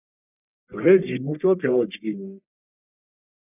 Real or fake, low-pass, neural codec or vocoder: fake; 3.6 kHz; codec, 44.1 kHz, 1.7 kbps, Pupu-Codec